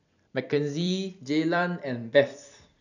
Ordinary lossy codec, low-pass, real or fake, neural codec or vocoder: none; 7.2 kHz; fake; vocoder, 44.1 kHz, 128 mel bands every 256 samples, BigVGAN v2